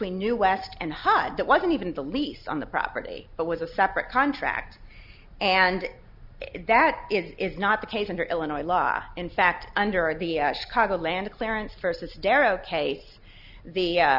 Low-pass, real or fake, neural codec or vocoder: 5.4 kHz; real; none